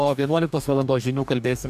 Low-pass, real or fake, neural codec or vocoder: 14.4 kHz; fake; codec, 44.1 kHz, 2.6 kbps, DAC